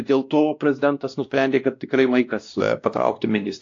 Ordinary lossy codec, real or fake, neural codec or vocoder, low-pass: AAC, 48 kbps; fake; codec, 16 kHz, 1 kbps, X-Codec, WavLM features, trained on Multilingual LibriSpeech; 7.2 kHz